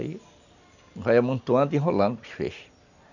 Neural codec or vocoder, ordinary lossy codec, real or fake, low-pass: none; none; real; 7.2 kHz